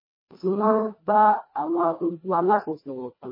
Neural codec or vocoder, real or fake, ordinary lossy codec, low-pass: codec, 24 kHz, 1.5 kbps, HILCodec; fake; MP3, 24 kbps; 5.4 kHz